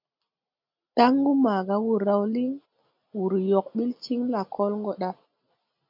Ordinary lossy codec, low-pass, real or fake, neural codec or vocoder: MP3, 48 kbps; 5.4 kHz; real; none